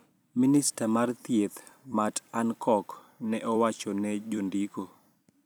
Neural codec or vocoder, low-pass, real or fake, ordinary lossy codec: vocoder, 44.1 kHz, 128 mel bands every 256 samples, BigVGAN v2; none; fake; none